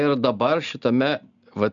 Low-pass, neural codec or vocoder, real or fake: 7.2 kHz; none; real